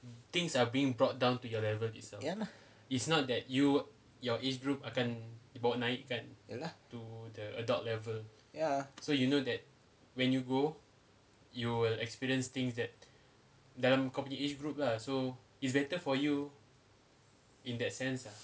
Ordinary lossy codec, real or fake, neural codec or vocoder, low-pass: none; real; none; none